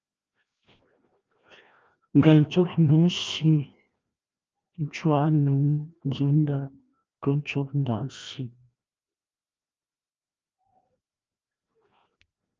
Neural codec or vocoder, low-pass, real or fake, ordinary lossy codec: codec, 16 kHz, 1 kbps, FreqCodec, larger model; 7.2 kHz; fake; Opus, 24 kbps